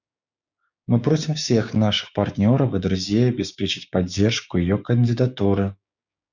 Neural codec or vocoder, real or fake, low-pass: codec, 16 kHz, 6 kbps, DAC; fake; 7.2 kHz